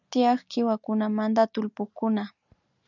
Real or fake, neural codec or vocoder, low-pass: real; none; 7.2 kHz